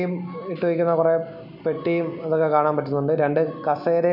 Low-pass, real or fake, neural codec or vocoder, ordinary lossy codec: 5.4 kHz; real; none; none